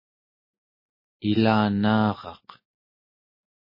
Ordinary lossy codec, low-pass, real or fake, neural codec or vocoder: MP3, 24 kbps; 5.4 kHz; real; none